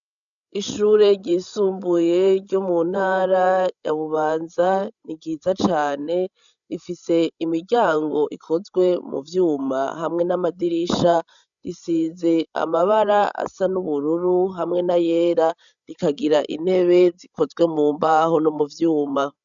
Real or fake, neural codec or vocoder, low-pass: fake; codec, 16 kHz, 16 kbps, FreqCodec, larger model; 7.2 kHz